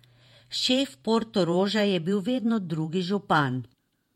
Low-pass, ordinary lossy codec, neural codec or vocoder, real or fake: 19.8 kHz; MP3, 64 kbps; vocoder, 48 kHz, 128 mel bands, Vocos; fake